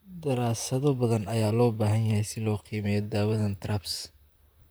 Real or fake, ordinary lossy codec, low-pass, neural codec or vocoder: fake; none; none; vocoder, 44.1 kHz, 128 mel bands, Pupu-Vocoder